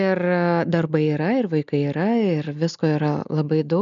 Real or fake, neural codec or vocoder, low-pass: real; none; 7.2 kHz